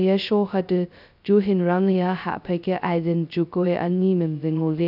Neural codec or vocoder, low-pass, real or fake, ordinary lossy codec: codec, 16 kHz, 0.2 kbps, FocalCodec; 5.4 kHz; fake; none